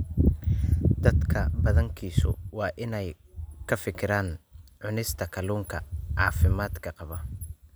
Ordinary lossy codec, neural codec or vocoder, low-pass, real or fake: none; none; none; real